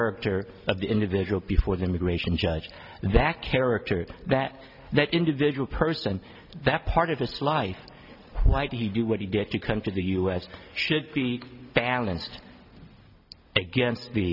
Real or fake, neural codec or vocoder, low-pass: real; none; 5.4 kHz